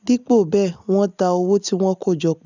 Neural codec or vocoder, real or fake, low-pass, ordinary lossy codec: none; real; 7.2 kHz; none